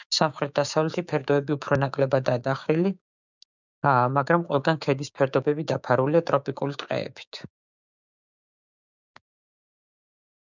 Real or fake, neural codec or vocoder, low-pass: fake; codec, 16 kHz, 6 kbps, DAC; 7.2 kHz